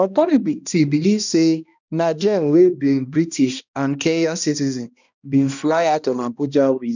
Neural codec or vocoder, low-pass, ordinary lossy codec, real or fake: codec, 16 kHz, 1 kbps, X-Codec, HuBERT features, trained on balanced general audio; 7.2 kHz; none; fake